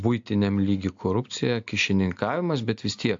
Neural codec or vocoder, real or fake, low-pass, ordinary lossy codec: none; real; 7.2 kHz; AAC, 64 kbps